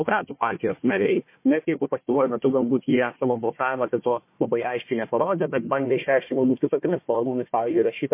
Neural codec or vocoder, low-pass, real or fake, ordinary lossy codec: codec, 16 kHz, 1 kbps, FunCodec, trained on Chinese and English, 50 frames a second; 3.6 kHz; fake; MP3, 24 kbps